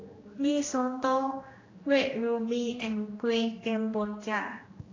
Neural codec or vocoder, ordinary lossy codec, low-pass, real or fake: codec, 16 kHz, 1 kbps, X-Codec, HuBERT features, trained on general audio; AAC, 32 kbps; 7.2 kHz; fake